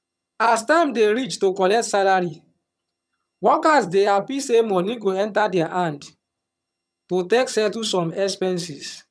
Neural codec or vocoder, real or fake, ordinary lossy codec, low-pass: vocoder, 22.05 kHz, 80 mel bands, HiFi-GAN; fake; none; none